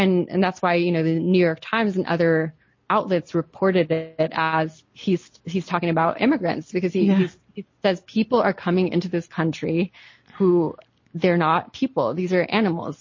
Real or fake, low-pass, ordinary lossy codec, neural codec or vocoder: real; 7.2 kHz; MP3, 32 kbps; none